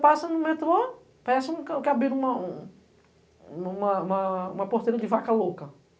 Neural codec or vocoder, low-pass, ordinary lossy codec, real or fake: none; none; none; real